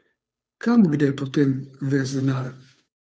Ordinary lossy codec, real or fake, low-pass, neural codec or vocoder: none; fake; none; codec, 16 kHz, 2 kbps, FunCodec, trained on Chinese and English, 25 frames a second